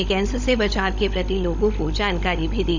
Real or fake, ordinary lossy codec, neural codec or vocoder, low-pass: fake; none; codec, 16 kHz, 16 kbps, FunCodec, trained on LibriTTS, 50 frames a second; 7.2 kHz